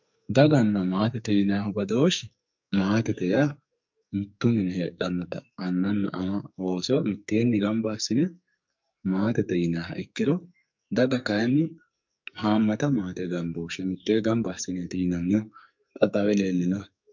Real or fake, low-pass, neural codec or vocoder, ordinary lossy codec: fake; 7.2 kHz; codec, 44.1 kHz, 2.6 kbps, SNAC; MP3, 64 kbps